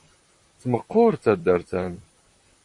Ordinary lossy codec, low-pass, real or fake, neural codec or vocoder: MP3, 48 kbps; 10.8 kHz; fake; vocoder, 44.1 kHz, 128 mel bands, Pupu-Vocoder